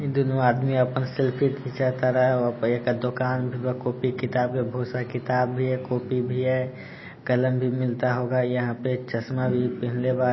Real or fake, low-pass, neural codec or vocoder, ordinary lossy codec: real; 7.2 kHz; none; MP3, 24 kbps